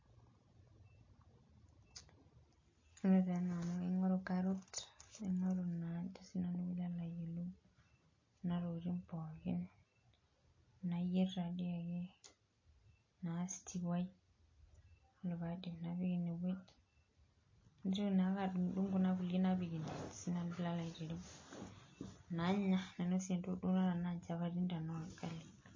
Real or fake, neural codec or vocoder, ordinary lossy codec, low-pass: real; none; MP3, 32 kbps; 7.2 kHz